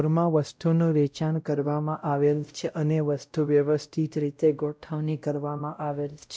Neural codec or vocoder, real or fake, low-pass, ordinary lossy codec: codec, 16 kHz, 0.5 kbps, X-Codec, WavLM features, trained on Multilingual LibriSpeech; fake; none; none